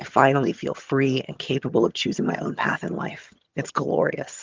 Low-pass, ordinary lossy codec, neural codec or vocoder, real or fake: 7.2 kHz; Opus, 32 kbps; vocoder, 22.05 kHz, 80 mel bands, HiFi-GAN; fake